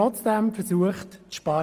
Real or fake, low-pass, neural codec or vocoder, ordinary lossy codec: real; 14.4 kHz; none; Opus, 24 kbps